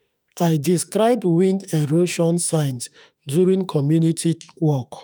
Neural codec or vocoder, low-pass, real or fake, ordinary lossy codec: autoencoder, 48 kHz, 32 numbers a frame, DAC-VAE, trained on Japanese speech; none; fake; none